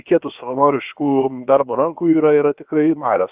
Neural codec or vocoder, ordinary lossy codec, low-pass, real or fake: codec, 16 kHz, about 1 kbps, DyCAST, with the encoder's durations; Opus, 24 kbps; 3.6 kHz; fake